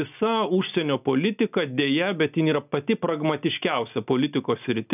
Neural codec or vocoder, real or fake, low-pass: none; real; 3.6 kHz